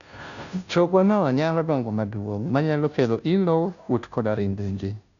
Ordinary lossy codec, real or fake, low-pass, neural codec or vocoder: none; fake; 7.2 kHz; codec, 16 kHz, 0.5 kbps, FunCodec, trained on Chinese and English, 25 frames a second